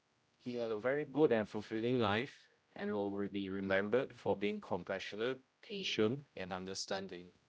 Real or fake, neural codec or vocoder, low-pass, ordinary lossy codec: fake; codec, 16 kHz, 0.5 kbps, X-Codec, HuBERT features, trained on general audio; none; none